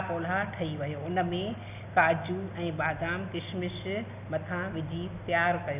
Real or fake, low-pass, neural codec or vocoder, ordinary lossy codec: real; 3.6 kHz; none; none